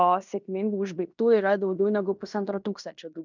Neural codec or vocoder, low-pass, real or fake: codec, 16 kHz in and 24 kHz out, 0.9 kbps, LongCat-Audio-Codec, fine tuned four codebook decoder; 7.2 kHz; fake